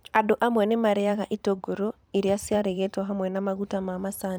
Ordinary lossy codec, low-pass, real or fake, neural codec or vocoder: none; none; fake; vocoder, 44.1 kHz, 128 mel bands every 512 samples, BigVGAN v2